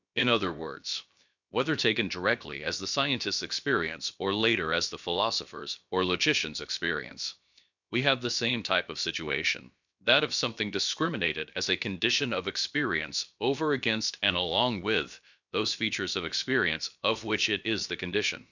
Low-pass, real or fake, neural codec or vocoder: 7.2 kHz; fake; codec, 16 kHz, about 1 kbps, DyCAST, with the encoder's durations